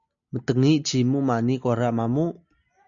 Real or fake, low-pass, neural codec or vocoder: real; 7.2 kHz; none